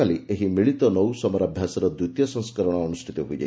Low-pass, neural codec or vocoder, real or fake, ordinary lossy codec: none; none; real; none